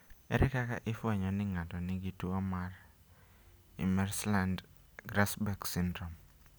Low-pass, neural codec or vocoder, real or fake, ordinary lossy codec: none; none; real; none